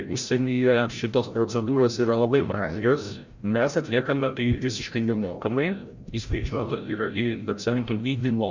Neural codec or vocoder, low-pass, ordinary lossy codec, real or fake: codec, 16 kHz, 0.5 kbps, FreqCodec, larger model; 7.2 kHz; Opus, 64 kbps; fake